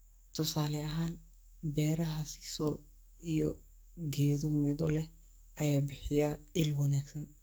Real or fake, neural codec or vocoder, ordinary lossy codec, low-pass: fake; codec, 44.1 kHz, 2.6 kbps, SNAC; none; none